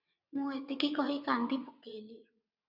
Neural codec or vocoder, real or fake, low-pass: vocoder, 22.05 kHz, 80 mel bands, WaveNeXt; fake; 5.4 kHz